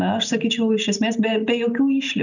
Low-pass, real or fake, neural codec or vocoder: 7.2 kHz; real; none